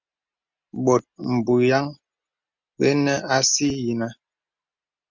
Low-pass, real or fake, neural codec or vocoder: 7.2 kHz; real; none